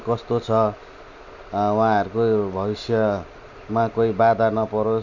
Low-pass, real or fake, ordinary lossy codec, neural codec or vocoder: 7.2 kHz; real; none; none